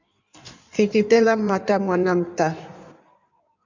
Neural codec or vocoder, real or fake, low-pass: codec, 16 kHz in and 24 kHz out, 1.1 kbps, FireRedTTS-2 codec; fake; 7.2 kHz